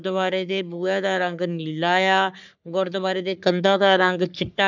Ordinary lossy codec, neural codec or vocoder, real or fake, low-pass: none; codec, 44.1 kHz, 3.4 kbps, Pupu-Codec; fake; 7.2 kHz